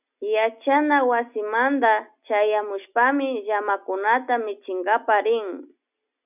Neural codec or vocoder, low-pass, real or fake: none; 3.6 kHz; real